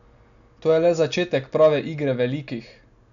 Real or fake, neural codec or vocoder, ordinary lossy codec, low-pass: real; none; none; 7.2 kHz